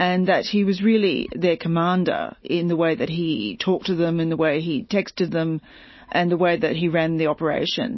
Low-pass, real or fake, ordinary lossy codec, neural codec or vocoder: 7.2 kHz; real; MP3, 24 kbps; none